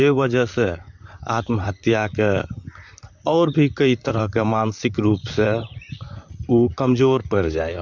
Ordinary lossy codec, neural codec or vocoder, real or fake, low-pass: MP3, 64 kbps; vocoder, 44.1 kHz, 128 mel bands, Pupu-Vocoder; fake; 7.2 kHz